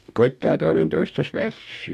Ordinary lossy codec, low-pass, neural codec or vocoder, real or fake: MP3, 96 kbps; 14.4 kHz; codec, 44.1 kHz, 2.6 kbps, DAC; fake